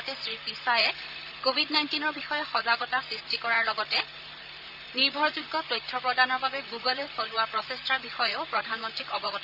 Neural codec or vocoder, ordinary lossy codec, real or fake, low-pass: vocoder, 44.1 kHz, 128 mel bands, Pupu-Vocoder; none; fake; 5.4 kHz